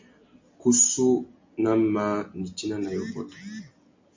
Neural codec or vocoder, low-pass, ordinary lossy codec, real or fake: none; 7.2 kHz; MP3, 64 kbps; real